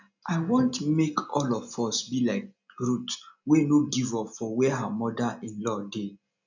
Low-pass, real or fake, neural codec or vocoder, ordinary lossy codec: 7.2 kHz; real; none; none